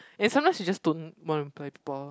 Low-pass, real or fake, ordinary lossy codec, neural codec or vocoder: none; real; none; none